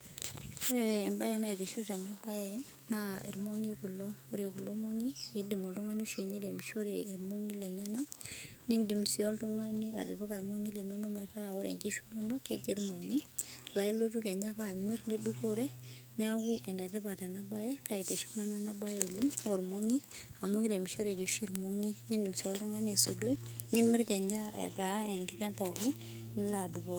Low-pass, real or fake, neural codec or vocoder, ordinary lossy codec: none; fake; codec, 44.1 kHz, 2.6 kbps, SNAC; none